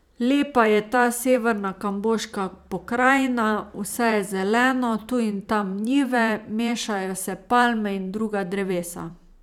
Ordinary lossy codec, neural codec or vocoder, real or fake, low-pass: none; vocoder, 44.1 kHz, 128 mel bands every 512 samples, BigVGAN v2; fake; 19.8 kHz